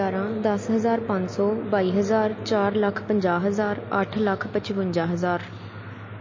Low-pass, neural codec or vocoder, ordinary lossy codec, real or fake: 7.2 kHz; none; MP3, 32 kbps; real